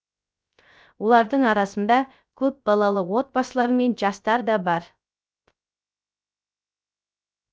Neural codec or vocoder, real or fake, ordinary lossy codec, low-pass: codec, 16 kHz, 0.3 kbps, FocalCodec; fake; none; none